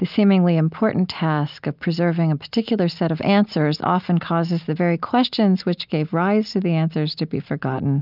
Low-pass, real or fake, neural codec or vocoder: 5.4 kHz; real; none